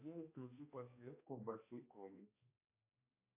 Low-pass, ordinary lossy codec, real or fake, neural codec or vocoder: 3.6 kHz; MP3, 24 kbps; fake; codec, 16 kHz, 1 kbps, X-Codec, HuBERT features, trained on balanced general audio